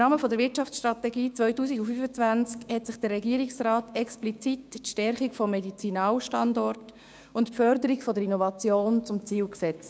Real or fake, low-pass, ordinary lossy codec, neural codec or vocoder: fake; none; none; codec, 16 kHz, 6 kbps, DAC